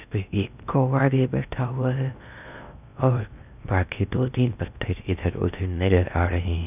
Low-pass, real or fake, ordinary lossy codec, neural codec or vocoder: 3.6 kHz; fake; none; codec, 16 kHz in and 24 kHz out, 0.6 kbps, FocalCodec, streaming, 2048 codes